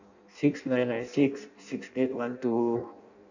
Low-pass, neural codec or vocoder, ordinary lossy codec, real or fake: 7.2 kHz; codec, 16 kHz in and 24 kHz out, 0.6 kbps, FireRedTTS-2 codec; none; fake